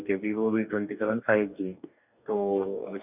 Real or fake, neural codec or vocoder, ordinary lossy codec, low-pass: fake; codec, 44.1 kHz, 2.6 kbps, DAC; none; 3.6 kHz